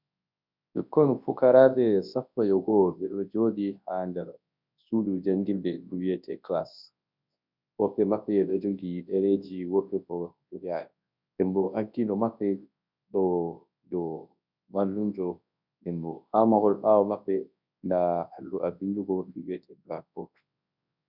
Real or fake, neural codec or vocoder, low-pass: fake; codec, 24 kHz, 0.9 kbps, WavTokenizer, large speech release; 5.4 kHz